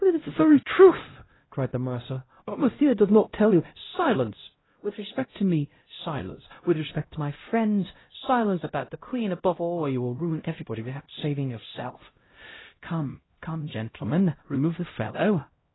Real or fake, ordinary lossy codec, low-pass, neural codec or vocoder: fake; AAC, 16 kbps; 7.2 kHz; codec, 16 kHz, 0.5 kbps, X-Codec, HuBERT features, trained on LibriSpeech